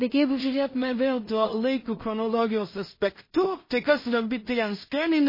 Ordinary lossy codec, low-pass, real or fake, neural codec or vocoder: MP3, 24 kbps; 5.4 kHz; fake; codec, 16 kHz in and 24 kHz out, 0.4 kbps, LongCat-Audio-Codec, two codebook decoder